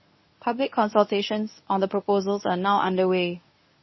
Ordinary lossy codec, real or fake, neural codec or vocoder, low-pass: MP3, 24 kbps; fake; codec, 24 kHz, 0.9 kbps, WavTokenizer, medium speech release version 1; 7.2 kHz